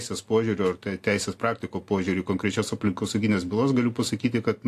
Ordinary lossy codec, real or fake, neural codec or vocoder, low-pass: AAC, 64 kbps; real; none; 14.4 kHz